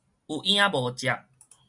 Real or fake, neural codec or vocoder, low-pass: real; none; 10.8 kHz